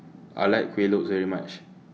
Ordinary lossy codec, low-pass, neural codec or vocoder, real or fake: none; none; none; real